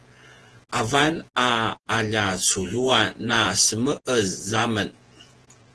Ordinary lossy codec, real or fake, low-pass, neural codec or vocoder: Opus, 16 kbps; fake; 10.8 kHz; vocoder, 48 kHz, 128 mel bands, Vocos